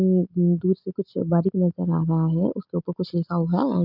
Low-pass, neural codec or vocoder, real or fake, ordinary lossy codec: 5.4 kHz; none; real; none